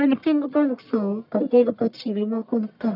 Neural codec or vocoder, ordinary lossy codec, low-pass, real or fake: codec, 44.1 kHz, 1.7 kbps, Pupu-Codec; none; 5.4 kHz; fake